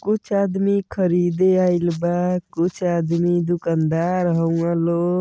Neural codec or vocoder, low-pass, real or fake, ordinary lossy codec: none; none; real; none